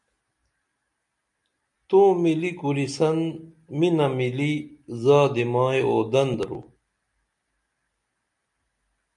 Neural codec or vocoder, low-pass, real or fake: none; 10.8 kHz; real